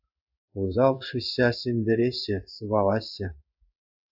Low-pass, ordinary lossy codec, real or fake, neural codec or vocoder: 5.4 kHz; AAC, 48 kbps; fake; codec, 16 kHz in and 24 kHz out, 1 kbps, XY-Tokenizer